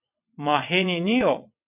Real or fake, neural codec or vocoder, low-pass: fake; vocoder, 22.05 kHz, 80 mel bands, WaveNeXt; 3.6 kHz